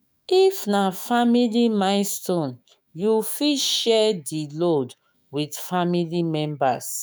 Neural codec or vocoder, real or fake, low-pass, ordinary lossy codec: autoencoder, 48 kHz, 128 numbers a frame, DAC-VAE, trained on Japanese speech; fake; none; none